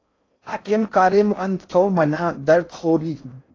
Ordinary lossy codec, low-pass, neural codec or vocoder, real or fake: AAC, 32 kbps; 7.2 kHz; codec, 16 kHz in and 24 kHz out, 0.6 kbps, FocalCodec, streaming, 4096 codes; fake